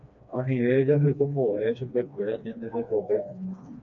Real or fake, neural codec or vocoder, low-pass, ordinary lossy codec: fake; codec, 16 kHz, 2 kbps, FreqCodec, smaller model; 7.2 kHz; AAC, 64 kbps